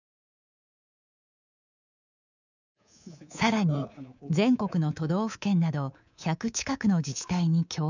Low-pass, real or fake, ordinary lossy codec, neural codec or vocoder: 7.2 kHz; fake; none; codec, 24 kHz, 3.1 kbps, DualCodec